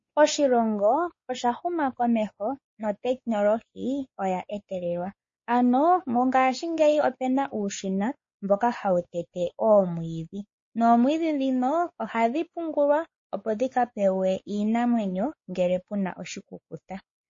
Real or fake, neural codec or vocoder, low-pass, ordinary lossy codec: fake; codec, 16 kHz, 4 kbps, X-Codec, WavLM features, trained on Multilingual LibriSpeech; 7.2 kHz; MP3, 32 kbps